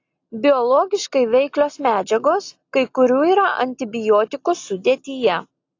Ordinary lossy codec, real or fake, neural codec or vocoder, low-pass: AAC, 48 kbps; real; none; 7.2 kHz